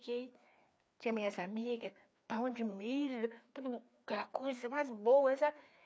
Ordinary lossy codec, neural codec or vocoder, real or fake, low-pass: none; codec, 16 kHz, 2 kbps, FreqCodec, larger model; fake; none